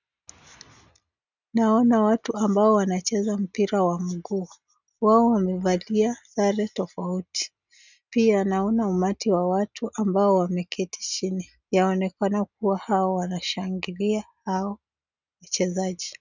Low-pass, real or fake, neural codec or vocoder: 7.2 kHz; real; none